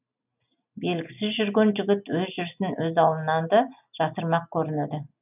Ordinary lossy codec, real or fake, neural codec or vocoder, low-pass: none; real; none; 3.6 kHz